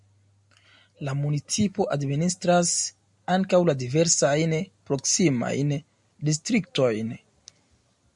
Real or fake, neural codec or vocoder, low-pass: real; none; 10.8 kHz